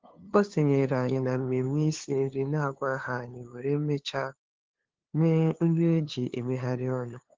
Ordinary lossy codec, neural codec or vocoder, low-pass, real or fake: Opus, 16 kbps; codec, 16 kHz, 2 kbps, FunCodec, trained on LibriTTS, 25 frames a second; 7.2 kHz; fake